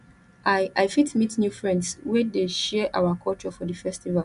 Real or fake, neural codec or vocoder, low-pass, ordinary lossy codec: real; none; 10.8 kHz; none